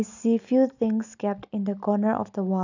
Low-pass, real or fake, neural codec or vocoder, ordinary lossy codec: 7.2 kHz; real; none; none